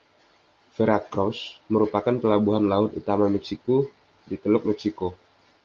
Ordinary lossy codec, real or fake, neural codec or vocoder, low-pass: Opus, 32 kbps; real; none; 7.2 kHz